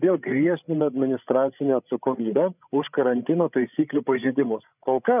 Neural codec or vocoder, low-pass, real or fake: codec, 16 kHz, 16 kbps, FreqCodec, larger model; 3.6 kHz; fake